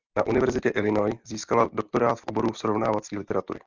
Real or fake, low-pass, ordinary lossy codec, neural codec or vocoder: real; 7.2 kHz; Opus, 24 kbps; none